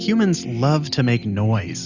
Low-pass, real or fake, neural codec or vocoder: 7.2 kHz; real; none